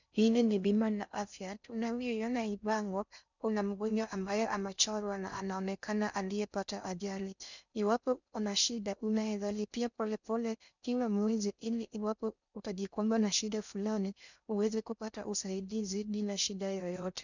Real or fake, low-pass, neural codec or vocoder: fake; 7.2 kHz; codec, 16 kHz in and 24 kHz out, 0.6 kbps, FocalCodec, streaming, 4096 codes